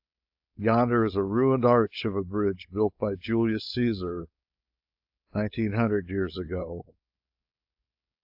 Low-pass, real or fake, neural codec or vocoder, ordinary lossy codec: 5.4 kHz; fake; codec, 16 kHz, 4.8 kbps, FACodec; AAC, 48 kbps